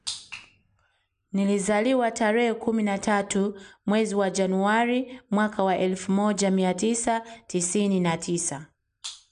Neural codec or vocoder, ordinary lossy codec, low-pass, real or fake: none; none; 9.9 kHz; real